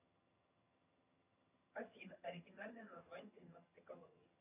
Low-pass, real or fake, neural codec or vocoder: 3.6 kHz; fake; vocoder, 22.05 kHz, 80 mel bands, HiFi-GAN